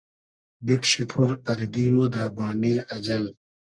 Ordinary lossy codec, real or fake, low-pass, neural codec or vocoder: Opus, 64 kbps; fake; 9.9 kHz; codec, 44.1 kHz, 1.7 kbps, Pupu-Codec